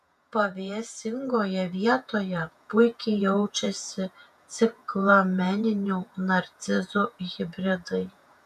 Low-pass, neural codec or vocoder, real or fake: 14.4 kHz; vocoder, 48 kHz, 128 mel bands, Vocos; fake